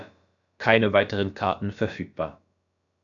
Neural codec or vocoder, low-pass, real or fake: codec, 16 kHz, about 1 kbps, DyCAST, with the encoder's durations; 7.2 kHz; fake